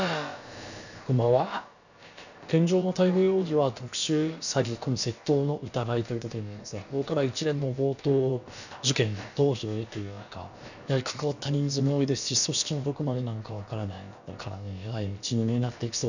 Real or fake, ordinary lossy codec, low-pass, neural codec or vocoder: fake; none; 7.2 kHz; codec, 16 kHz, about 1 kbps, DyCAST, with the encoder's durations